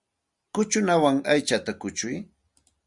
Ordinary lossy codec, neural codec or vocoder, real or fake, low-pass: Opus, 64 kbps; none; real; 10.8 kHz